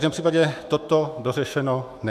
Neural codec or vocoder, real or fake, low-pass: autoencoder, 48 kHz, 128 numbers a frame, DAC-VAE, trained on Japanese speech; fake; 14.4 kHz